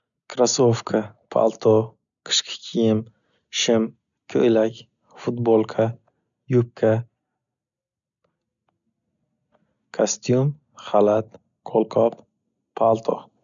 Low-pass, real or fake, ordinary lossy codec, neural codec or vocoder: 7.2 kHz; real; none; none